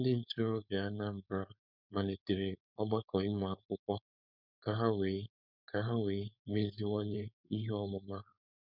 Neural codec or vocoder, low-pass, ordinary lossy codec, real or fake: codec, 16 kHz, 4.8 kbps, FACodec; 5.4 kHz; none; fake